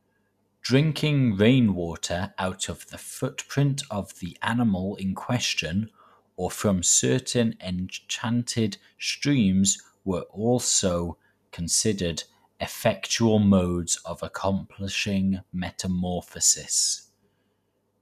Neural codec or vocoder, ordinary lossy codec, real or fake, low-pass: none; none; real; 14.4 kHz